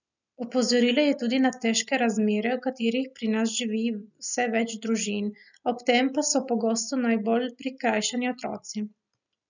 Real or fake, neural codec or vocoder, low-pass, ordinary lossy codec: real; none; 7.2 kHz; none